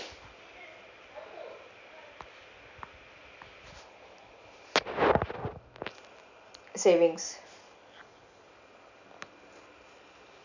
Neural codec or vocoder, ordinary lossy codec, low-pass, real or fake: none; none; 7.2 kHz; real